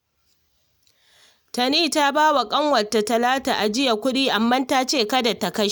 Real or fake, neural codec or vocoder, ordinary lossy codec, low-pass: real; none; none; none